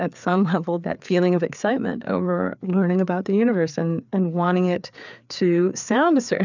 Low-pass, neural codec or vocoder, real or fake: 7.2 kHz; codec, 16 kHz, 4 kbps, FreqCodec, larger model; fake